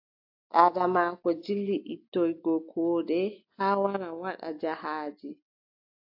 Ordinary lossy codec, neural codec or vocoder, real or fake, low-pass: AAC, 48 kbps; none; real; 5.4 kHz